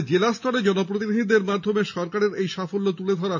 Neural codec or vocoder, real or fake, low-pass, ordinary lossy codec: none; real; 7.2 kHz; MP3, 64 kbps